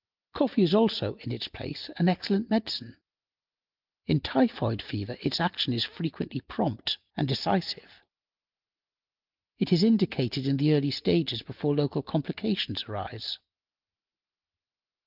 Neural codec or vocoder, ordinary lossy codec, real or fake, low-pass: none; Opus, 24 kbps; real; 5.4 kHz